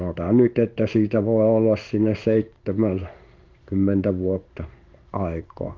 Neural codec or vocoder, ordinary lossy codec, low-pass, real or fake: codec, 16 kHz in and 24 kHz out, 1 kbps, XY-Tokenizer; Opus, 24 kbps; 7.2 kHz; fake